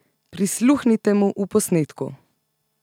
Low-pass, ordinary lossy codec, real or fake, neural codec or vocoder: 19.8 kHz; none; real; none